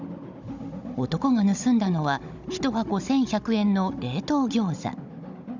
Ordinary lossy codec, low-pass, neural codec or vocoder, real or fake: none; 7.2 kHz; codec, 16 kHz, 16 kbps, FunCodec, trained on Chinese and English, 50 frames a second; fake